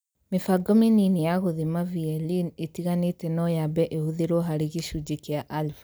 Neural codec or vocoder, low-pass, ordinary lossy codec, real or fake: none; none; none; real